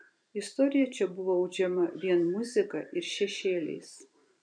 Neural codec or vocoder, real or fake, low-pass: vocoder, 44.1 kHz, 128 mel bands every 512 samples, BigVGAN v2; fake; 9.9 kHz